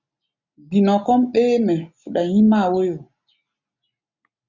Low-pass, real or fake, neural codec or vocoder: 7.2 kHz; real; none